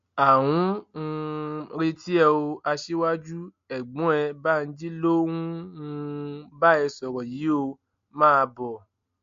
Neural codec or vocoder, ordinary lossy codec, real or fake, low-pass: none; MP3, 48 kbps; real; 7.2 kHz